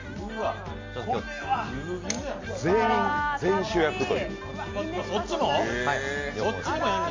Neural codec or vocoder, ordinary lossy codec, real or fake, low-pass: none; MP3, 48 kbps; real; 7.2 kHz